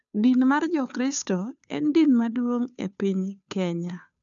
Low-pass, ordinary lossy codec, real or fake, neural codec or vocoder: 7.2 kHz; none; fake; codec, 16 kHz, 2 kbps, FunCodec, trained on Chinese and English, 25 frames a second